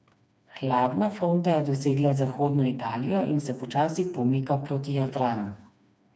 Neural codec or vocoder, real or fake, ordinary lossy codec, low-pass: codec, 16 kHz, 2 kbps, FreqCodec, smaller model; fake; none; none